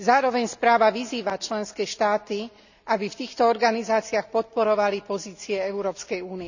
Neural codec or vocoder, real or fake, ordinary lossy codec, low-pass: none; real; none; 7.2 kHz